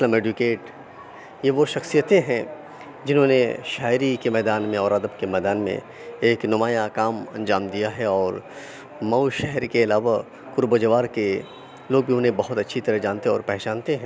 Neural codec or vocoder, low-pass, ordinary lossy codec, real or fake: none; none; none; real